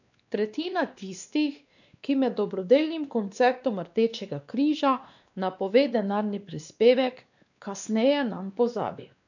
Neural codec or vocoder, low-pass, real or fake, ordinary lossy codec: codec, 16 kHz, 2 kbps, X-Codec, WavLM features, trained on Multilingual LibriSpeech; 7.2 kHz; fake; none